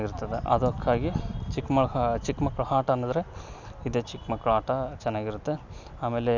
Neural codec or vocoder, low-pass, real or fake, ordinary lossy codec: none; 7.2 kHz; real; none